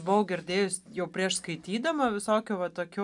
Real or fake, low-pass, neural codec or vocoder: real; 10.8 kHz; none